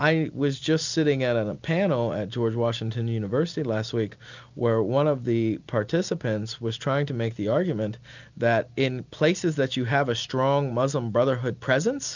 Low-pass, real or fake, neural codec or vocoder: 7.2 kHz; real; none